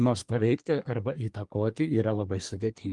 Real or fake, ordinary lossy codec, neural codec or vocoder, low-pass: fake; Opus, 24 kbps; codec, 24 kHz, 1 kbps, SNAC; 10.8 kHz